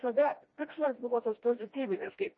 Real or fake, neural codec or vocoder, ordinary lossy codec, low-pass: fake; codec, 16 kHz, 1 kbps, FreqCodec, smaller model; MP3, 32 kbps; 7.2 kHz